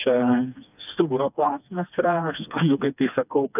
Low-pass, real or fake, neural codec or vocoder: 3.6 kHz; fake; codec, 16 kHz, 2 kbps, FreqCodec, smaller model